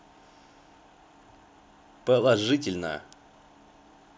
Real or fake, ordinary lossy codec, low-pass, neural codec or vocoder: real; none; none; none